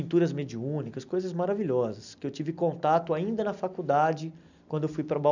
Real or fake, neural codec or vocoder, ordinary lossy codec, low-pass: real; none; none; 7.2 kHz